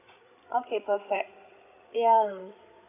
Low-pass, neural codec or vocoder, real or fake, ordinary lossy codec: 3.6 kHz; codec, 16 kHz, 8 kbps, FreqCodec, larger model; fake; none